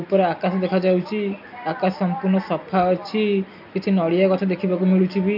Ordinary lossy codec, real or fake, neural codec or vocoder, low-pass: none; real; none; 5.4 kHz